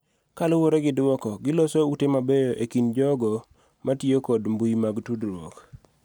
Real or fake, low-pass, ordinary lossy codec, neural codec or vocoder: real; none; none; none